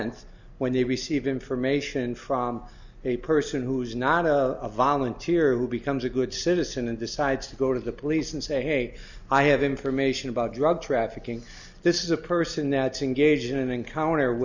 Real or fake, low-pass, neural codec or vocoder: real; 7.2 kHz; none